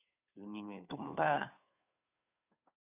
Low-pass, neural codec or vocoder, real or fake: 3.6 kHz; codec, 16 kHz, 2 kbps, FunCodec, trained on Chinese and English, 25 frames a second; fake